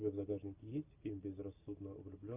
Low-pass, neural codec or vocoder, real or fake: 3.6 kHz; none; real